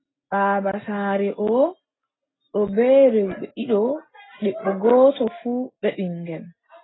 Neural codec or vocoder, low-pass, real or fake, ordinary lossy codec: none; 7.2 kHz; real; AAC, 16 kbps